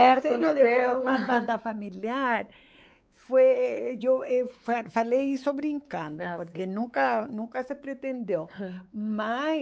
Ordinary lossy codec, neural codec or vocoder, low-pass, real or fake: none; codec, 16 kHz, 4 kbps, X-Codec, WavLM features, trained on Multilingual LibriSpeech; none; fake